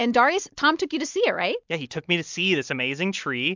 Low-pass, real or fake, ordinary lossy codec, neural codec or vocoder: 7.2 kHz; real; MP3, 64 kbps; none